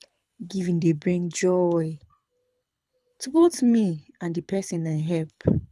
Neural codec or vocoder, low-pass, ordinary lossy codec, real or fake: codec, 24 kHz, 6 kbps, HILCodec; none; none; fake